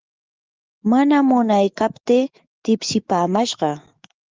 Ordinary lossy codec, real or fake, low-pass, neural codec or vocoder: Opus, 24 kbps; fake; 7.2 kHz; autoencoder, 48 kHz, 128 numbers a frame, DAC-VAE, trained on Japanese speech